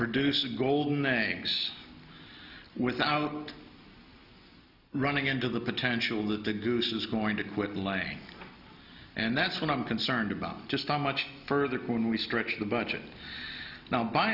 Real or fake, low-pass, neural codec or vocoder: real; 5.4 kHz; none